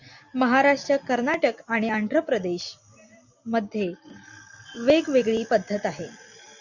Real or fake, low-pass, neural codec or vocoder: real; 7.2 kHz; none